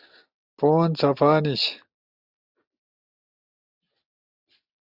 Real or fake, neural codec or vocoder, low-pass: real; none; 5.4 kHz